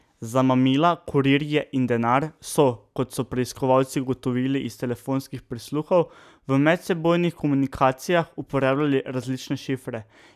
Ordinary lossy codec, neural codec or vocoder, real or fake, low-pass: none; none; real; 14.4 kHz